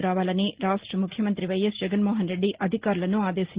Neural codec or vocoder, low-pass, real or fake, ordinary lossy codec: none; 3.6 kHz; real; Opus, 16 kbps